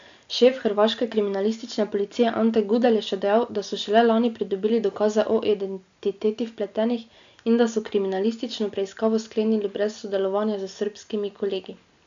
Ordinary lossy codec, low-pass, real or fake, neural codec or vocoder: AAC, 64 kbps; 7.2 kHz; real; none